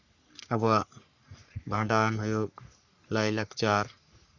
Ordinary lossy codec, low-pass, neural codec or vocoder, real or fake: none; 7.2 kHz; codec, 44.1 kHz, 3.4 kbps, Pupu-Codec; fake